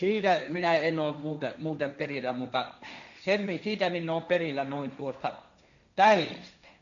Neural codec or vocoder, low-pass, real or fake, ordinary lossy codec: codec, 16 kHz, 1.1 kbps, Voila-Tokenizer; 7.2 kHz; fake; none